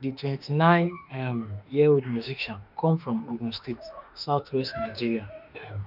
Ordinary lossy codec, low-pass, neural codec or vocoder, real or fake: none; 5.4 kHz; autoencoder, 48 kHz, 32 numbers a frame, DAC-VAE, trained on Japanese speech; fake